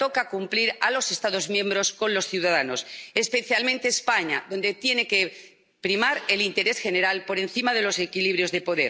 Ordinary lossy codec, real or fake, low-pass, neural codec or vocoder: none; real; none; none